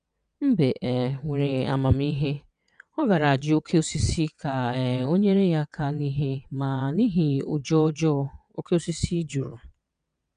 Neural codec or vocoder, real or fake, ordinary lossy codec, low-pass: vocoder, 22.05 kHz, 80 mel bands, WaveNeXt; fake; none; 9.9 kHz